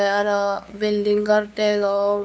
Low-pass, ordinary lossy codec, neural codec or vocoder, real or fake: none; none; codec, 16 kHz, 2 kbps, FunCodec, trained on LibriTTS, 25 frames a second; fake